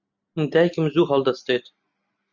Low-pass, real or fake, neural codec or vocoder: 7.2 kHz; real; none